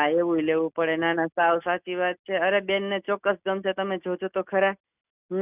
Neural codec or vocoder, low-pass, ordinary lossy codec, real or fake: none; 3.6 kHz; none; real